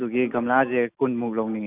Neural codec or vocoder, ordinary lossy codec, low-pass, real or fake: none; Opus, 64 kbps; 3.6 kHz; real